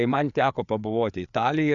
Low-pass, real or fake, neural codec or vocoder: 7.2 kHz; fake; codec, 16 kHz, 4 kbps, FreqCodec, larger model